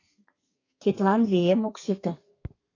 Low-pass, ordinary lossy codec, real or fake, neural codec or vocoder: 7.2 kHz; AAC, 32 kbps; fake; codec, 32 kHz, 1.9 kbps, SNAC